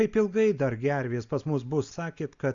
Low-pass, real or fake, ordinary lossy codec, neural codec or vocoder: 7.2 kHz; real; Opus, 64 kbps; none